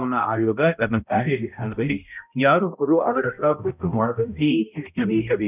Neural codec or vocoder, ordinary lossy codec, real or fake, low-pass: codec, 16 kHz, 0.5 kbps, X-Codec, HuBERT features, trained on balanced general audio; none; fake; 3.6 kHz